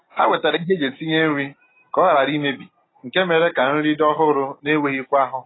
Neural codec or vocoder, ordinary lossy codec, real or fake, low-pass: none; AAC, 16 kbps; real; 7.2 kHz